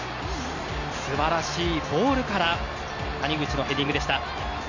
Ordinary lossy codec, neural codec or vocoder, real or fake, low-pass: none; none; real; 7.2 kHz